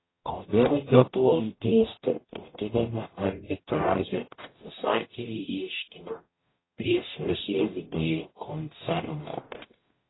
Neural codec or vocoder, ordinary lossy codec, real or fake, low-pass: codec, 44.1 kHz, 0.9 kbps, DAC; AAC, 16 kbps; fake; 7.2 kHz